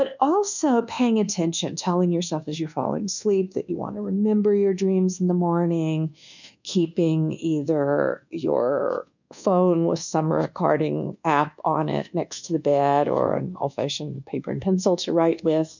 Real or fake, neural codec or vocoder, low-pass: fake; codec, 24 kHz, 1.2 kbps, DualCodec; 7.2 kHz